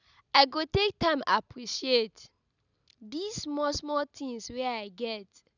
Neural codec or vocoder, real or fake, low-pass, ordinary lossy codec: none; real; 7.2 kHz; none